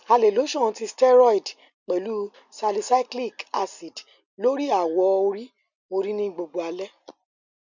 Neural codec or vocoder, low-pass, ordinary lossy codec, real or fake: none; 7.2 kHz; none; real